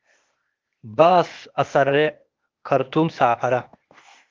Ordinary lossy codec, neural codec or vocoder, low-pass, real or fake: Opus, 32 kbps; codec, 16 kHz, 0.8 kbps, ZipCodec; 7.2 kHz; fake